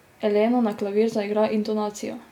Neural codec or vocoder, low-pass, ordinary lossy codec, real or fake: none; 19.8 kHz; none; real